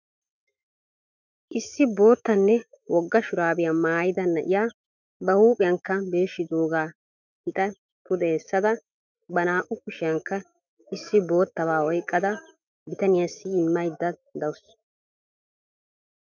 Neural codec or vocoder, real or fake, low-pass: autoencoder, 48 kHz, 128 numbers a frame, DAC-VAE, trained on Japanese speech; fake; 7.2 kHz